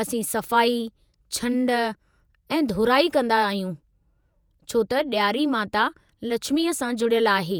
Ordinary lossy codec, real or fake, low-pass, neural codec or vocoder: none; fake; none; vocoder, 48 kHz, 128 mel bands, Vocos